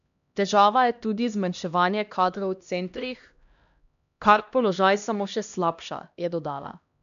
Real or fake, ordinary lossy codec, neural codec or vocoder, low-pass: fake; none; codec, 16 kHz, 1 kbps, X-Codec, HuBERT features, trained on LibriSpeech; 7.2 kHz